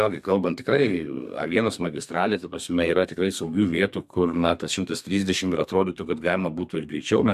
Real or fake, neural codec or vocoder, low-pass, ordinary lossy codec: fake; codec, 32 kHz, 1.9 kbps, SNAC; 14.4 kHz; MP3, 96 kbps